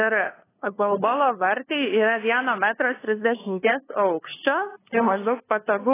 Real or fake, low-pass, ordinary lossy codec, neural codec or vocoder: fake; 3.6 kHz; AAC, 16 kbps; codec, 16 kHz, 2 kbps, FunCodec, trained on LibriTTS, 25 frames a second